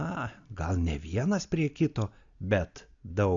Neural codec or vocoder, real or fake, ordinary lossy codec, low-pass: none; real; Opus, 64 kbps; 7.2 kHz